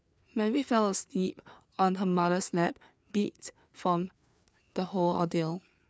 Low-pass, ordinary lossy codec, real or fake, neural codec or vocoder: none; none; fake; codec, 16 kHz, 4 kbps, FreqCodec, larger model